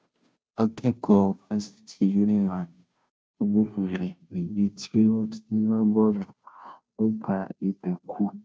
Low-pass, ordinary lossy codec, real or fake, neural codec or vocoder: none; none; fake; codec, 16 kHz, 0.5 kbps, FunCodec, trained on Chinese and English, 25 frames a second